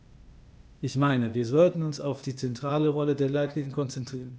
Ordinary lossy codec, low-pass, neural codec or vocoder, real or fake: none; none; codec, 16 kHz, 0.8 kbps, ZipCodec; fake